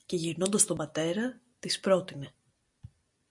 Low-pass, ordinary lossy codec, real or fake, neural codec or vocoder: 10.8 kHz; MP3, 64 kbps; real; none